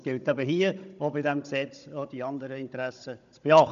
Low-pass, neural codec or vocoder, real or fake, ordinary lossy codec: 7.2 kHz; codec, 16 kHz, 16 kbps, FunCodec, trained on Chinese and English, 50 frames a second; fake; none